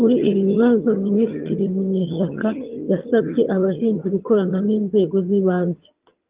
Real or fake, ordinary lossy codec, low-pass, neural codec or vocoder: fake; Opus, 32 kbps; 3.6 kHz; vocoder, 22.05 kHz, 80 mel bands, HiFi-GAN